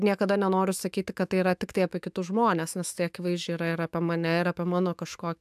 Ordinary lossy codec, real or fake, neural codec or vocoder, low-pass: AAC, 96 kbps; fake; autoencoder, 48 kHz, 128 numbers a frame, DAC-VAE, trained on Japanese speech; 14.4 kHz